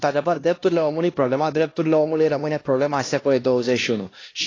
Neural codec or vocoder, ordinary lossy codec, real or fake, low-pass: codec, 16 kHz, 1 kbps, X-Codec, HuBERT features, trained on LibriSpeech; AAC, 32 kbps; fake; 7.2 kHz